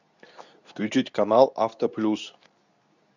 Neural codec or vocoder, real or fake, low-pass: codec, 24 kHz, 0.9 kbps, WavTokenizer, medium speech release version 2; fake; 7.2 kHz